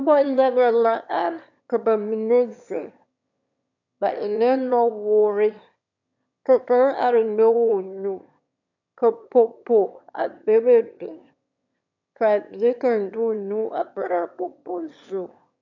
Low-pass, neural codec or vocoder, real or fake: 7.2 kHz; autoencoder, 22.05 kHz, a latent of 192 numbers a frame, VITS, trained on one speaker; fake